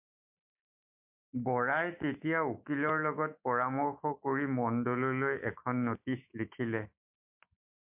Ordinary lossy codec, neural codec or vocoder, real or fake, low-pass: MP3, 32 kbps; autoencoder, 48 kHz, 128 numbers a frame, DAC-VAE, trained on Japanese speech; fake; 3.6 kHz